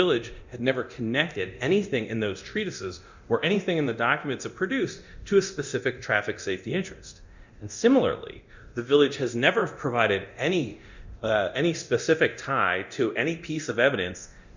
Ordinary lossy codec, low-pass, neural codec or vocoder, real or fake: Opus, 64 kbps; 7.2 kHz; codec, 24 kHz, 0.9 kbps, DualCodec; fake